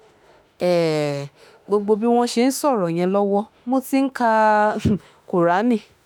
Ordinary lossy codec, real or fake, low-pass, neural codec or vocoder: none; fake; none; autoencoder, 48 kHz, 32 numbers a frame, DAC-VAE, trained on Japanese speech